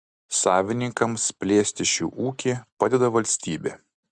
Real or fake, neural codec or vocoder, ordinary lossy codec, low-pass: real; none; Opus, 64 kbps; 9.9 kHz